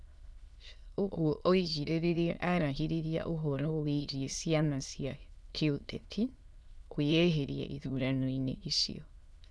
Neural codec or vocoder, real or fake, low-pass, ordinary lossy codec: autoencoder, 22.05 kHz, a latent of 192 numbers a frame, VITS, trained on many speakers; fake; none; none